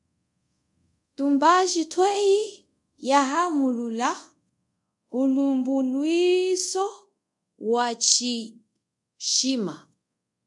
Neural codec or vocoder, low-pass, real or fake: codec, 24 kHz, 0.5 kbps, DualCodec; 10.8 kHz; fake